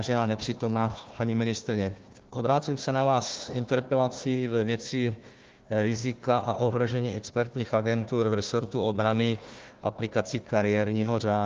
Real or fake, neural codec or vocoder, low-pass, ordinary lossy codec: fake; codec, 16 kHz, 1 kbps, FunCodec, trained on Chinese and English, 50 frames a second; 7.2 kHz; Opus, 32 kbps